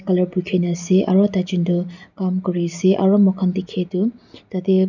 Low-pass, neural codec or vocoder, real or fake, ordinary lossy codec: 7.2 kHz; none; real; none